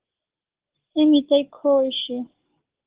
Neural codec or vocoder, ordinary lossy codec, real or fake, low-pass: codec, 44.1 kHz, 7.8 kbps, DAC; Opus, 16 kbps; fake; 3.6 kHz